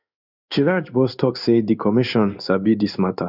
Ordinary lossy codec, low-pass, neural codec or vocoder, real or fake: none; 5.4 kHz; codec, 16 kHz in and 24 kHz out, 1 kbps, XY-Tokenizer; fake